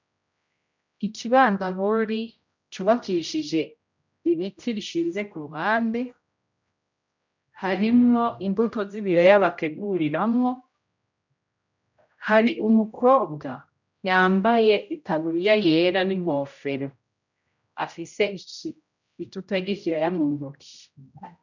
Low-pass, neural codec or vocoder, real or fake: 7.2 kHz; codec, 16 kHz, 0.5 kbps, X-Codec, HuBERT features, trained on general audio; fake